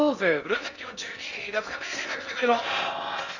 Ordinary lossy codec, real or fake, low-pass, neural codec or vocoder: none; fake; 7.2 kHz; codec, 16 kHz in and 24 kHz out, 0.6 kbps, FocalCodec, streaming, 4096 codes